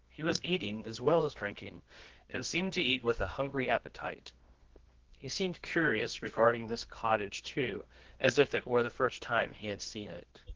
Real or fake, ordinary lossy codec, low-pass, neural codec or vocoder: fake; Opus, 16 kbps; 7.2 kHz; codec, 24 kHz, 0.9 kbps, WavTokenizer, medium music audio release